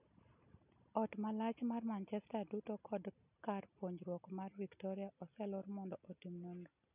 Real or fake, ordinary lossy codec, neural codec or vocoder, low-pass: real; none; none; 3.6 kHz